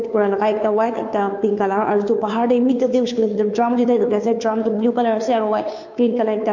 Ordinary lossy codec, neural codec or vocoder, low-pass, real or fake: MP3, 48 kbps; codec, 16 kHz, 2 kbps, FunCodec, trained on Chinese and English, 25 frames a second; 7.2 kHz; fake